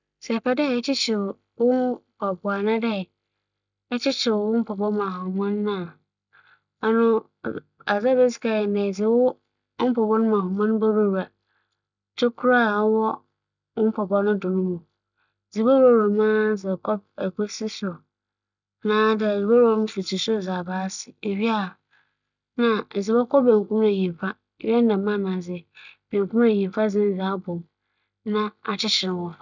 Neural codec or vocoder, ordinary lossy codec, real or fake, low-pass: none; none; real; 7.2 kHz